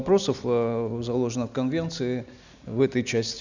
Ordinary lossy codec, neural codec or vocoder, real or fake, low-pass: none; none; real; 7.2 kHz